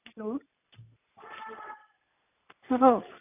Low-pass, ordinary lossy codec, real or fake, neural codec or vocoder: 3.6 kHz; none; real; none